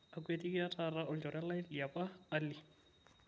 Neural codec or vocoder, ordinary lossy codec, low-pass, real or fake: none; none; none; real